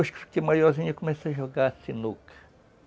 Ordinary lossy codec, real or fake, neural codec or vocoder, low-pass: none; real; none; none